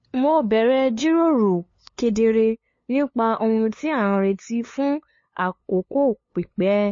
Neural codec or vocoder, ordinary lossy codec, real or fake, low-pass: codec, 16 kHz, 2 kbps, FunCodec, trained on LibriTTS, 25 frames a second; MP3, 32 kbps; fake; 7.2 kHz